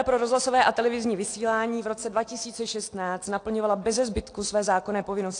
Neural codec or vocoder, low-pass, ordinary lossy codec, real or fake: none; 9.9 kHz; AAC, 48 kbps; real